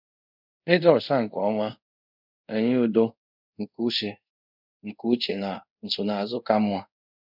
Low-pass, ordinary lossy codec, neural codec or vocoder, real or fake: 5.4 kHz; none; codec, 24 kHz, 0.5 kbps, DualCodec; fake